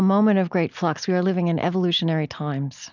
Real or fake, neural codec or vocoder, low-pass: real; none; 7.2 kHz